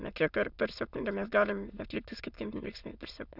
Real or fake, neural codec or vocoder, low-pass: fake; autoencoder, 22.05 kHz, a latent of 192 numbers a frame, VITS, trained on many speakers; 5.4 kHz